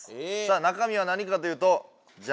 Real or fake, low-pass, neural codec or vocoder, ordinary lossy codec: real; none; none; none